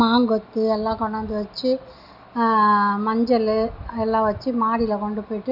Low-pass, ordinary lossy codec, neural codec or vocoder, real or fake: 5.4 kHz; none; none; real